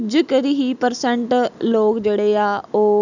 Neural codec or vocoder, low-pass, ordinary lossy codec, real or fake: none; 7.2 kHz; none; real